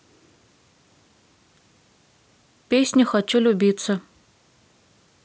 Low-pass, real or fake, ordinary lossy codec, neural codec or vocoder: none; real; none; none